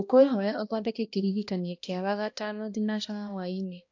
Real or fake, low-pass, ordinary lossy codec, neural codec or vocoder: fake; 7.2 kHz; AAC, 48 kbps; codec, 16 kHz, 1 kbps, X-Codec, HuBERT features, trained on balanced general audio